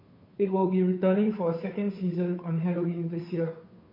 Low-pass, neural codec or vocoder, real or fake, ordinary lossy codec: 5.4 kHz; codec, 16 kHz, 2 kbps, FunCodec, trained on Chinese and English, 25 frames a second; fake; MP3, 32 kbps